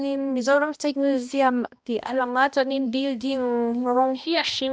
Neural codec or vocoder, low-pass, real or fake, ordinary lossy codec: codec, 16 kHz, 1 kbps, X-Codec, HuBERT features, trained on balanced general audio; none; fake; none